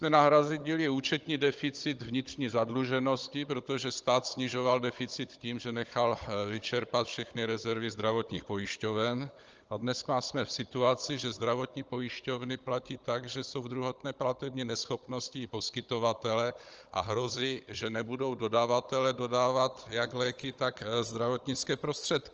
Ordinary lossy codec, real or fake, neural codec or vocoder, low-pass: Opus, 24 kbps; fake; codec, 16 kHz, 16 kbps, FunCodec, trained on Chinese and English, 50 frames a second; 7.2 kHz